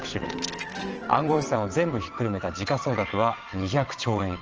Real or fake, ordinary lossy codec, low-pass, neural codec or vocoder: fake; Opus, 16 kbps; 7.2 kHz; vocoder, 22.05 kHz, 80 mel bands, WaveNeXt